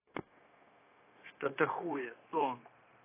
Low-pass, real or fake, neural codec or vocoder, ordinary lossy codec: 3.6 kHz; fake; codec, 16 kHz, 0.9 kbps, LongCat-Audio-Codec; AAC, 24 kbps